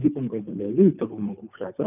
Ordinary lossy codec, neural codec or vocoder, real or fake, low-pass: none; codec, 24 kHz, 3 kbps, HILCodec; fake; 3.6 kHz